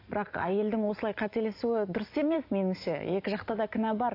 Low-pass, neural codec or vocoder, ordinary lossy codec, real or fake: 5.4 kHz; none; AAC, 32 kbps; real